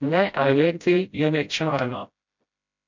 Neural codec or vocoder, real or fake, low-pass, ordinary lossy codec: codec, 16 kHz, 0.5 kbps, FreqCodec, smaller model; fake; 7.2 kHz; MP3, 64 kbps